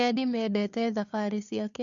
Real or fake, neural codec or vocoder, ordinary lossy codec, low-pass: fake; codec, 16 kHz, 2 kbps, FunCodec, trained on Chinese and English, 25 frames a second; none; 7.2 kHz